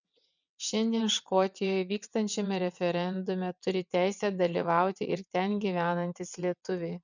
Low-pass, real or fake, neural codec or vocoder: 7.2 kHz; fake; vocoder, 22.05 kHz, 80 mel bands, WaveNeXt